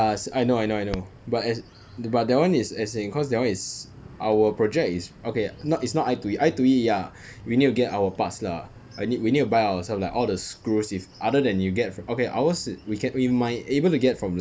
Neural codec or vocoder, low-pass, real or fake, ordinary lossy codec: none; none; real; none